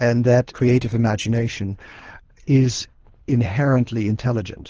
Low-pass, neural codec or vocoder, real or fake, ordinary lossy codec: 7.2 kHz; codec, 24 kHz, 3 kbps, HILCodec; fake; Opus, 16 kbps